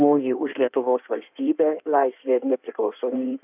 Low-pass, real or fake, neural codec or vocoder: 3.6 kHz; fake; codec, 16 kHz in and 24 kHz out, 1.1 kbps, FireRedTTS-2 codec